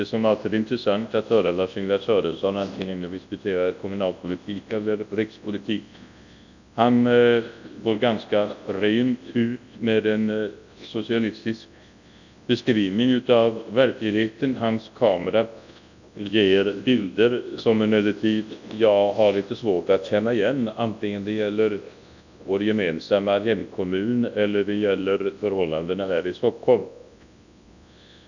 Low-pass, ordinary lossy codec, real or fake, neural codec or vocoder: 7.2 kHz; none; fake; codec, 24 kHz, 0.9 kbps, WavTokenizer, large speech release